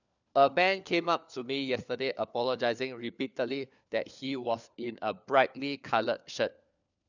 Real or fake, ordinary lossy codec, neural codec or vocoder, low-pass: fake; none; codec, 16 kHz, 4 kbps, FunCodec, trained on LibriTTS, 50 frames a second; 7.2 kHz